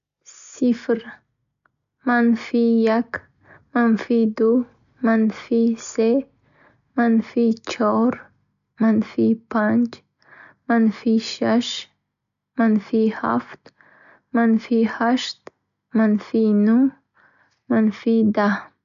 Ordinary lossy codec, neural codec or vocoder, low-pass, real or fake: none; none; 7.2 kHz; real